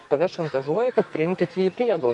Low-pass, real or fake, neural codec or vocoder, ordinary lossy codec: 10.8 kHz; fake; codec, 44.1 kHz, 2.6 kbps, SNAC; AAC, 64 kbps